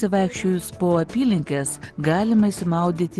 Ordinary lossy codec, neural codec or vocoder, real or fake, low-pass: Opus, 16 kbps; none; real; 10.8 kHz